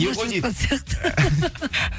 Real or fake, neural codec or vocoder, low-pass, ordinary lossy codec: real; none; none; none